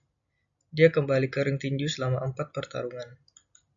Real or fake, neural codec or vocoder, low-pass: real; none; 7.2 kHz